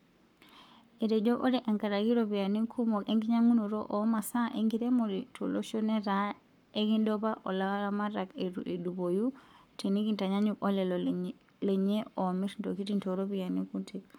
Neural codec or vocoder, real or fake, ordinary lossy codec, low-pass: codec, 44.1 kHz, 7.8 kbps, Pupu-Codec; fake; none; 19.8 kHz